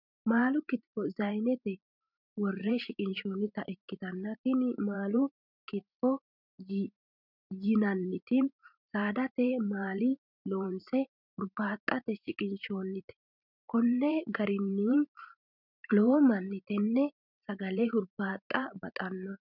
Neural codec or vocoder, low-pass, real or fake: vocoder, 44.1 kHz, 128 mel bands every 512 samples, BigVGAN v2; 5.4 kHz; fake